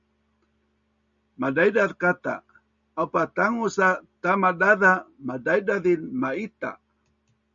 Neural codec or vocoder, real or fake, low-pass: none; real; 7.2 kHz